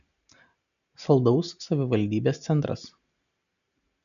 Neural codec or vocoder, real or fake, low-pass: none; real; 7.2 kHz